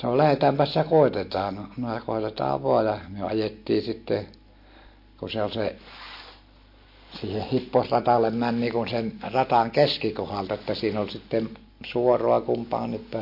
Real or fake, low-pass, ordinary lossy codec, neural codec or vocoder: real; 5.4 kHz; MP3, 32 kbps; none